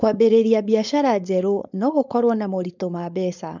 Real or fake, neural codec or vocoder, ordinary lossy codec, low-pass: fake; codec, 16 kHz, 4.8 kbps, FACodec; none; 7.2 kHz